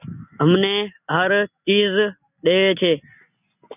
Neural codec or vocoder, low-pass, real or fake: none; 3.6 kHz; real